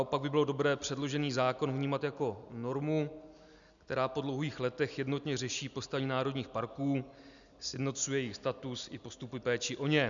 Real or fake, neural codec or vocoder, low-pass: real; none; 7.2 kHz